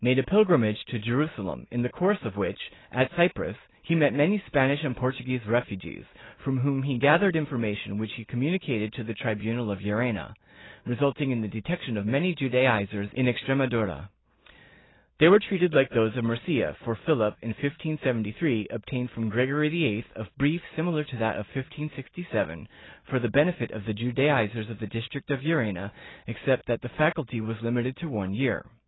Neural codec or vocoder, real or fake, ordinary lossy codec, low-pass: none; real; AAC, 16 kbps; 7.2 kHz